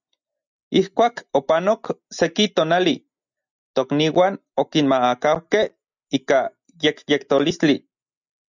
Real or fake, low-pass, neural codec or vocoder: real; 7.2 kHz; none